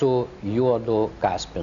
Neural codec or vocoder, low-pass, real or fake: none; 7.2 kHz; real